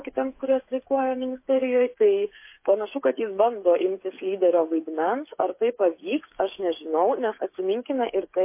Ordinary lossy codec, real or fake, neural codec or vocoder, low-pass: MP3, 24 kbps; fake; codec, 16 kHz, 8 kbps, FreqCodec, smaller model; 3.6 kHz